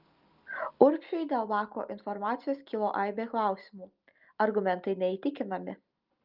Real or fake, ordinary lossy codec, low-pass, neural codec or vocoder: real; Opus, 24 kbps; 5.4 kHz; none